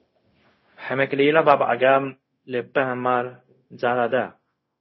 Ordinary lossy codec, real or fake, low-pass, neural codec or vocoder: MP3, 24 kbps; fake; 7.2 kHz; codec, 16 kHz, 0.4 kbps, LongCat-Audio-Codec